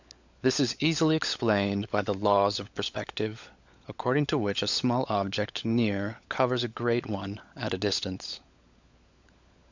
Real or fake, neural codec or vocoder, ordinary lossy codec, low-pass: fake; codec, 16 kHz, 16 kbps, FunCodec, trained on LibriTTS, 50 frames a second; Opus, 64 kbps; 7.2 kHz